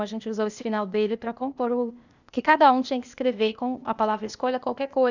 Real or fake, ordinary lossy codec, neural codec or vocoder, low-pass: fake; none; codec, 16 kHz, 0.8 kbps, ZipCodec; 7.2 kHz